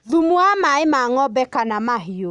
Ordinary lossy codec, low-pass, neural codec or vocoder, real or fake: none; 10.8 kHz; none; real